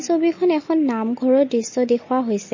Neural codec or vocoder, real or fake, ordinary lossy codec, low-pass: none; real; MP3, 32 kbps; 7.2 kHz